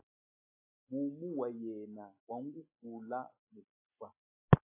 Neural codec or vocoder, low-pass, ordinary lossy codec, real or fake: none; 3.6 kHz; AAC, 32 kbps; real